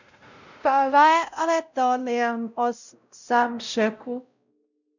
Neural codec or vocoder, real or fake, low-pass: codec, 16 kHz, 0.5 kbps, X-Codec, WavLM features, trained on Multilingual LibriSpeech; fake; 7.2 kHz